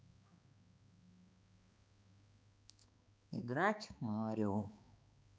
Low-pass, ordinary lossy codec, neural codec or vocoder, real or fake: none; none; codec, 16 kHz, 2 kbps, X-Codec, HuBERT features, trained on balanced general audio; fake